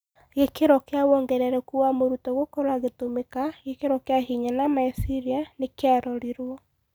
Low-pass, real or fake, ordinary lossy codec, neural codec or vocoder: none; real; none; none